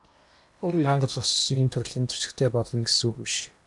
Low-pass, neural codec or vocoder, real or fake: 10.8 kHz; codec, 16 kHz in and 24 kHz out, 0.8 kbps, FocalCodec, streaming, 65536 codes; fake